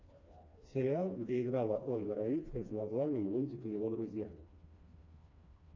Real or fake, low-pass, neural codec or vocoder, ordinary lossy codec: fake; 7.2 kHz; codec, 16 kHz, 2 kbps, FreqCodec, smaller model; MP3, 64 kbps